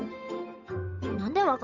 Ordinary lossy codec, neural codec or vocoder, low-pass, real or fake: none; codec, 16 kHz, 8 kbps, FunCodec, trained on Chinese and English, 25 frames a second; 7.2 kHz; fake